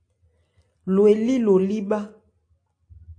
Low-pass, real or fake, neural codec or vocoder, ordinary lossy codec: 9.9 kHz; real; none; AAC, 48 kbps